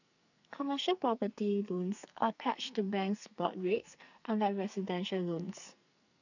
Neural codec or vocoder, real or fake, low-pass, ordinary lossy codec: codec, 44.1 kHz, 2.6 kbps, SNAC; fake; 7.2 kHz; none